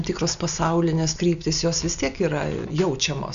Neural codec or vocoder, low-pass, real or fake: none; 7.2 kHz; real